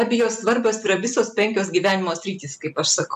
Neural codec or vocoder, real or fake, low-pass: none; real; 14.4 kHz